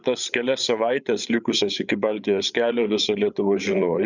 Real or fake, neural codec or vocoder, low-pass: fake; codec, 16 kHz, 8 kbps, FreqCodec, larger model; 7.2 kHz